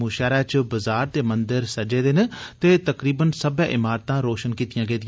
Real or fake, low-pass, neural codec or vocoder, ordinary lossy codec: real; none; none; none